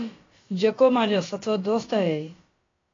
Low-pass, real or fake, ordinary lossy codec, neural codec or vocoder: 7.2 kHz; fake; AAC, 32 kbps; codec, 16 kHz, about 1 kbps, DyCAST, with the encoder's durations